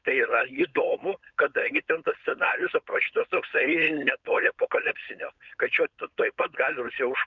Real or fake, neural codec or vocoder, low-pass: fake; codec, 16 kHz, 4.8 kbps, FACodec; 7.2 kHz